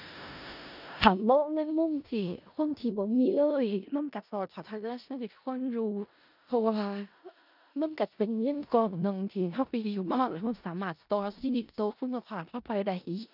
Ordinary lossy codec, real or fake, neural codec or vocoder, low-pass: none; fake; codec, 16 kHz in and 24 kHz out, 0.4 kbps, LongCat-Audio-Codec, four codebook decoder; 5.4 kHz